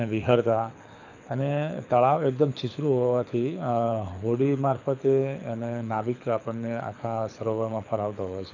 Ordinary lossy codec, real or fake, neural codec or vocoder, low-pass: none; fake; codec, 24 kHz, 6 kbps, HILCodec; 7.2 kHz